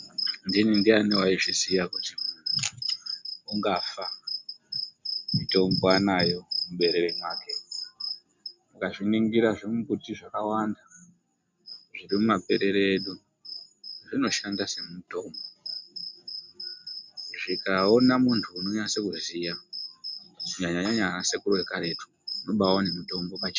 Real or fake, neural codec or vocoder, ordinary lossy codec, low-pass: real; none; MP3, 64 kbps; 7.2 kHz